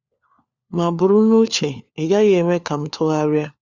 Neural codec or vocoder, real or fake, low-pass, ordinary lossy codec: codec, 16 kHz, 4 kbps, FunCodec, trained on LibriTTS, 50 frames a second; fake; 7.2 kHz; Opus, 64 kbps